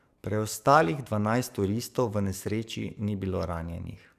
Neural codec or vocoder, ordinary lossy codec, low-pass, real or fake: codec, 44.1 kHz, 7.8 kbps, DAC; none; 14.4 kHz; fake